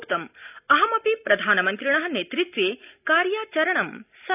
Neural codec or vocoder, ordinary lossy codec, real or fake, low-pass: none; none; real; 3.6 kHz